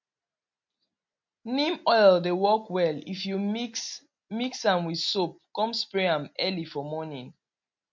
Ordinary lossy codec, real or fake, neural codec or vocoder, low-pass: MP3, 48 kbps; real; none; 7.2 kHz